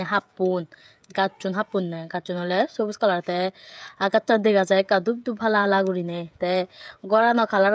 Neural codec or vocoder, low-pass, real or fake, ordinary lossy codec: codec, 16 kHz, 16 kbps, FreqCodec, smaller model; none; fake; none